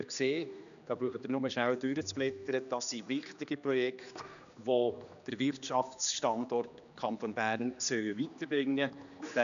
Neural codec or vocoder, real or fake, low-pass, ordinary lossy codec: codec, 16 kHz, 2 kbps, X-Codec, HuBERT features, trained on general audio; fake; 7.2 kHz; none